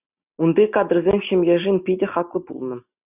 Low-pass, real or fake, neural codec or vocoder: 3.6 kHz; real; none